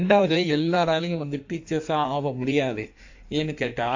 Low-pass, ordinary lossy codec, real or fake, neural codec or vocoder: 7.2 kHz; AAC, 48 kbps; fake; codec, 16 kHz in and 24 kHz out, 1.1 kbps, FireRedTTS-2 codec